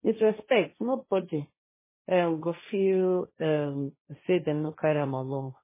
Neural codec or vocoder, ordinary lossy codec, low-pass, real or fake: codec, 16 kHz, 1.1 kbps, Voila-Tokenizer; MP3, 16 kbps; 3.6 kHz; fake